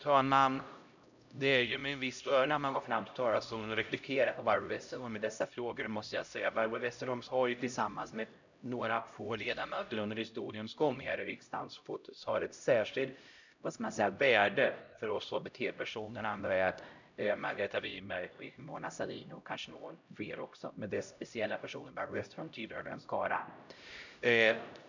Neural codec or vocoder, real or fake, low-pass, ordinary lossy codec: codec, 16 kHz, 0.5 kbps, X-Codec, HuBERT features, trained on LibriSpeech; fake; 7.2 kHz; none